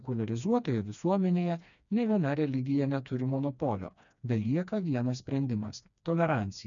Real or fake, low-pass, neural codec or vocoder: fake; 7.2 kHz; codec, 16 kHz, 2 kbps, FreqCodec, smaller model